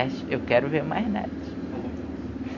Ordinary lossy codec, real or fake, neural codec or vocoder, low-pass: AAC, 48 kbps; real; none; 7.2 kHz